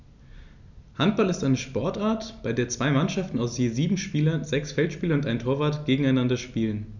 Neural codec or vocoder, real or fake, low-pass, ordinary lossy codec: none; real; 7.2 kHz; none